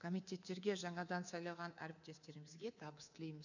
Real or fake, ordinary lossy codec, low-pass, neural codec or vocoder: fake; none; 7.2 kHz; codec, 24 kHz, 3.1 kbps, DualCodec